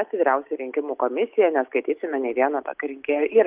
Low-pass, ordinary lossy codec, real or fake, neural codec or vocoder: 3.6 kHz; Opus, 24 kbps; real; none